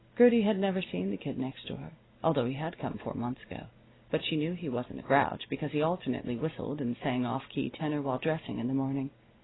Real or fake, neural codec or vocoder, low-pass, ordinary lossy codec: real; none; 7.2 kHz; AAC, 16 kbps